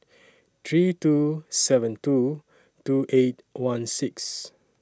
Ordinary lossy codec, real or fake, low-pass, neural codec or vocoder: none; real; none; none